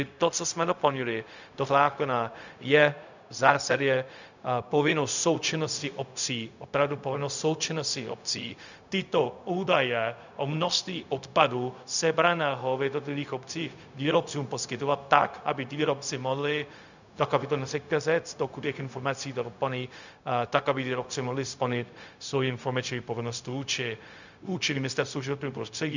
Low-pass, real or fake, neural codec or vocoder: 7.2 kHz; fake; codec, 16 kHz, 0.4 kbps, LongCat-Audio-Codec